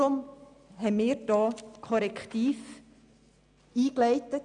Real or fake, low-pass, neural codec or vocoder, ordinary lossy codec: real; 9.9 kHz; none; none